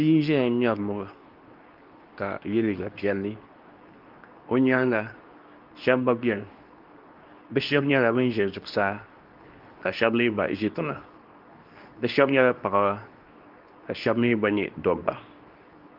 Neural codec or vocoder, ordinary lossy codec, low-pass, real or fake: codec, 24 kHz, 0.9 kbps, WavTokenizer, medium speech release version 2; Opus, 24 kbps; 5.4 kHz; fake